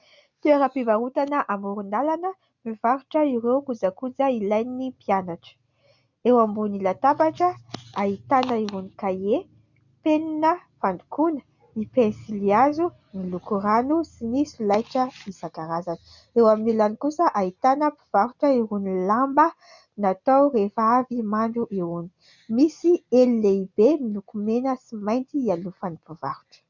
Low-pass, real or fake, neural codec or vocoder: 7.2 kHz; real; none